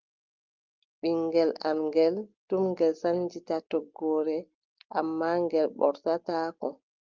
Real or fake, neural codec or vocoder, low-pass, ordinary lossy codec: real; none; 7.2 kHz; Opus, 32 kbps